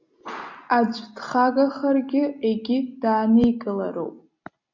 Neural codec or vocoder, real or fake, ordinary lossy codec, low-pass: none; real; MP3, 48 kbps; 7.2 kHz